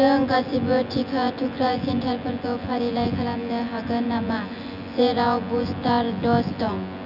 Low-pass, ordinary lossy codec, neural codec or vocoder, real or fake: 5.4 kHz; none; vocoder, 24 kHz, 100 mel bands, Vocos; fake